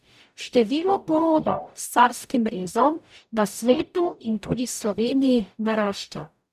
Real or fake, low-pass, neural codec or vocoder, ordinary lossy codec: fake; 14.4 kHz; codec, 44.1 kHz, 0.9 kbps, DAC; none